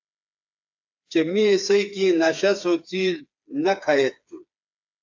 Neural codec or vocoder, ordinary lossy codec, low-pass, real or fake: codec, 16 kHz, 4 kbps, FreqCodec, smaller model; AAC, 48 kbps; 7.2 kHz; fake